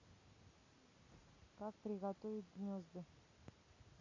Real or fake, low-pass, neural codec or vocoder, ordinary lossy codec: real; 7.2 kHz; none; Opus, 64 kbps